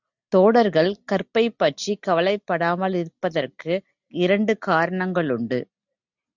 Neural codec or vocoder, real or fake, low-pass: none; real; 7.2 kHz